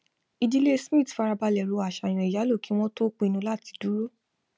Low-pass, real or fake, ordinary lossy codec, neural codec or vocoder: none; real; none; none